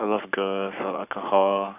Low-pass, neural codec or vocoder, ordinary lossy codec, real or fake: 3.6 kHz; codec, 44.1 kHz, 7.8 kbps, Pupu-Codec; none; fake